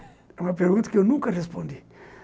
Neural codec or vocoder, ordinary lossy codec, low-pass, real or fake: none; none; none; real